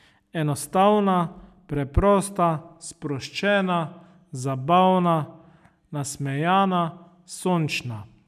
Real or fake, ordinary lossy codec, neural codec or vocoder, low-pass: real; none; none; 14.4 kHz